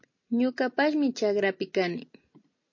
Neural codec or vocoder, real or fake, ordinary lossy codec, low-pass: none; real; MP3, 32 kbps; 7.2 kHz